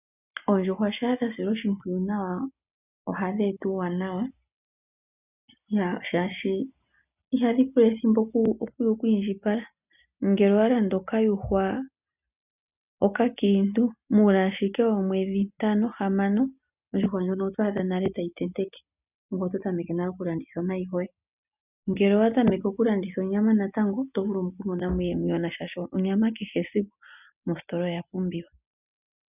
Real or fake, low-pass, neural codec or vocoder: real; 3.6 kHz; none